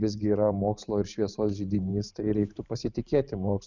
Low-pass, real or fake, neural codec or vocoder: 7.2 kHz; fake; vocoder, 44.1 kHz, 128 mel bands every 512 samples, BigVGAN v2